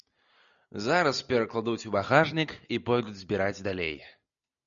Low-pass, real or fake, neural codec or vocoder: 7.2 kHz; real; none